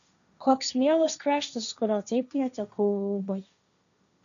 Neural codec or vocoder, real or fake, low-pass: codec, 16 kHz, 1.1 kbps, Voila-Tokenizer; fake; 7.2 kHz